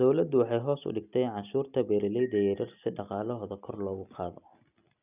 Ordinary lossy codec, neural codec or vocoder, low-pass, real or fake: none; none; 3.6 kHz; real